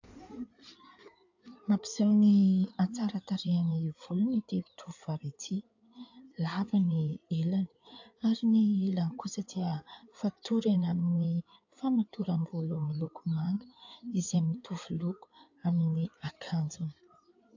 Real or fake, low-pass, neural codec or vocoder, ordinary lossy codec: fake; 7.2 kHz; codec, 16 kHz in and 24 kHz out, 2.2 kbps, FireRedTTS-2 codec; AAC, 48 kbps